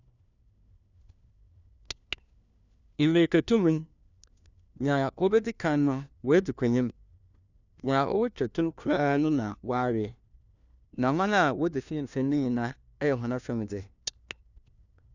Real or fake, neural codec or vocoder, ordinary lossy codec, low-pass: fake; codec, 16 kHz, 1 kbps, FunCodec, trained on LibriTTS, 50 frames a second; none; 7.2 kHz